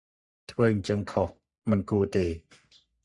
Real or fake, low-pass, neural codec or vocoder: fake; 10.8 kHz; codec, 44.1 kHz, 3.4 kbps, Pupu-Codec